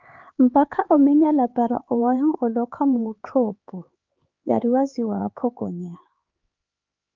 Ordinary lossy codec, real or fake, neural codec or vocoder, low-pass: Opus, 32 kbps; fake; codec, 16 kHz, 4 kbps, X-Codec, HuBERT features, trained on LibriSpeech; 7.2 kHz